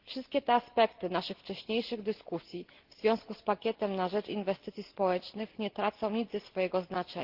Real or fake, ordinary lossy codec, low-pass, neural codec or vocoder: real; Opus, 16 kbps; 5.4 kHz; none